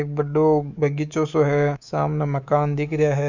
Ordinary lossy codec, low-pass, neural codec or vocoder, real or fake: none; 7.2 kHz; codec, 24 kHz, 3.1 kbps, DualCodec; fake